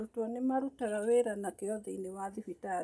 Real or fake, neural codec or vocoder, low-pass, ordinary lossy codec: real; none; none; none